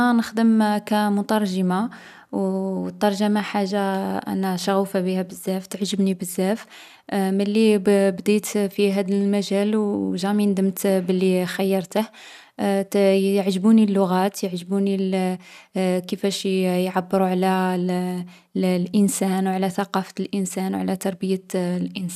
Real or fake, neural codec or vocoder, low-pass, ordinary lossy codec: real; none; 14.4 kHz; none